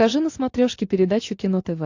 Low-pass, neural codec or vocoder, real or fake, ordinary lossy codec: 7.2 kHz; none; real; AAC, 48 kbps